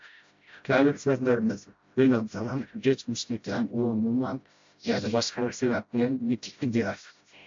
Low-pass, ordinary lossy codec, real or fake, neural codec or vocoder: 7.2 kHz; MP3, 48 kbps; fake; codec, 16 kHz, 0.5 kbps, FreqCodec, smaller model